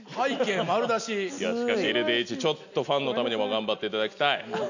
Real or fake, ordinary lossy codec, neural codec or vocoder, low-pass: real; none; none; 7.2 kHz